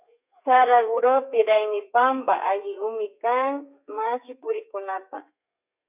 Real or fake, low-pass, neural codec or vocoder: fake; 3.6 kHz; codec, 32 kHz, 1.9 kbps, SNAC